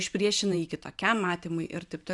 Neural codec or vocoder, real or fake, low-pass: vocoder, 44.1 kHz, 128 mel bands every 512 samples, BigVGAN v2; fake; 10.8 kHz